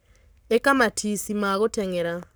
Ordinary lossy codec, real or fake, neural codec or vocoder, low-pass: none; fake; codec, 44.1 kHz, 7.8 kbps, Pupu-Codec; none